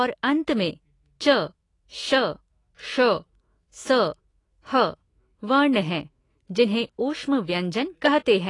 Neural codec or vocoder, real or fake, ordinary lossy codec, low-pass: autoencoder, 48 kHz, 128 numbers a frame, DAC-VAE, trained on Japanese speech; fake; AAC, 32 kbps; 10.8 kHz